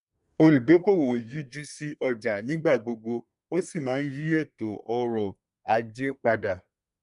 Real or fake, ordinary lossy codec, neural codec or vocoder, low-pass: fake; MP3, 96 kbps; codec, 24 kHz, 1 kbps, SNAC; 10.8 kHz